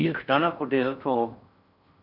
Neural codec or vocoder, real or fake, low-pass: codec, 16 kHz in and 24 kHz out, 0.6 kbps, FocalCodec, streaming, 4096 codes; fake; 5.4 kHz